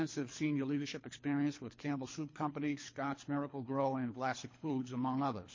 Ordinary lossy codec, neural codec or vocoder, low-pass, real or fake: MP3, 32 kbps; codec, 24 kHz, 3 kbps, HILCodec; 7.2 kHz; fake